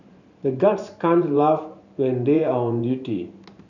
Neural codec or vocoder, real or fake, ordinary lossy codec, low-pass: vocoder, 44.1 kHz, 128 mel bands every 512 samples, BigVGAN v2; fake; none; 7.2 kHz